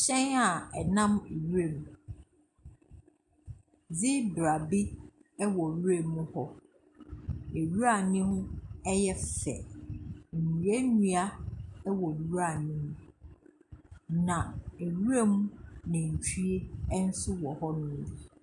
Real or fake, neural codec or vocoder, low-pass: real; none; 10.8 kHz